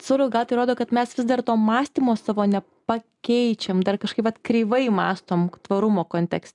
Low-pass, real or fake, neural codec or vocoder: 10.8 kHz; real; none